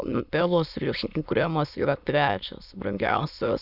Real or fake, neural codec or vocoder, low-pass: fake; autoencoder, 22.05 kHz, a latent of 192 numbers a frame, VITS, trained on many speakers; 5.4 kHz